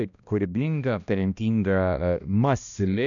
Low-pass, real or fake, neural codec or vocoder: 7.2 kHz; fake; codec, 16 kHz, 1 kbps, X-Codec, HuBERT features, trained on balanced general audio